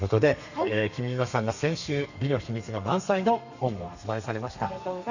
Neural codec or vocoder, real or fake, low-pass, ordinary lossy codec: codec, 32 kHz, 1.9 kbps, SNAC; fake; 7.2 kHz; none